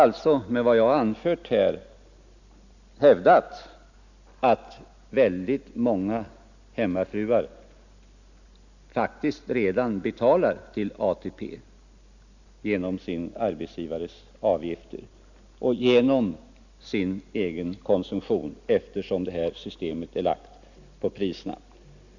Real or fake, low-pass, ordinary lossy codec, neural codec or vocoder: real; 7.2 kHz; none; none